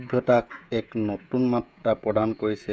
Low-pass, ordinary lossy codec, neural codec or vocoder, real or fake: none; none; codec, 16 kHz, 16 kbps, FreqCodec, smaller model; fake